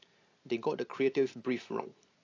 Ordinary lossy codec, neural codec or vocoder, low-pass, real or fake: AAC, 32 kbps; none; 7.2 kHz; real